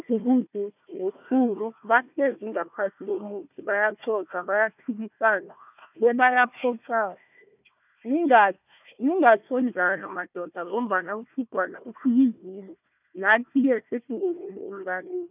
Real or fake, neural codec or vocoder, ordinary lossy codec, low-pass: fake; codec, 16 kHz, 1 kbps, FunCodec, trained on Chinese and English, 50 frames a second; none; 3.6 kHz